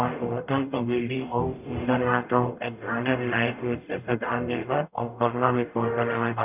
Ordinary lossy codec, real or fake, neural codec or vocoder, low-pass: none; fake; codec, 44.1 kHz, 0.9 kbps, DAC; 3.6 kHz